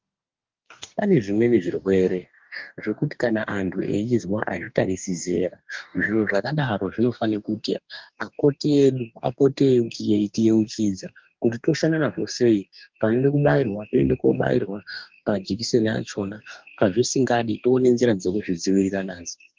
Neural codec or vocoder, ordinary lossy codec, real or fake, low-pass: codec, 44.1 kHz, 2.6 kbps, DAC; Opus, 32 kbps; fake; 7.2 kHz